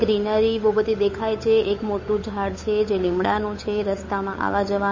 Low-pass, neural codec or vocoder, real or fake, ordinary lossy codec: 7.2 kHz; codec, 16 kHz, 8 kbps, FunCodec, trained on Chinese and English, 25 frames a second; fake; MP3, 32 kbps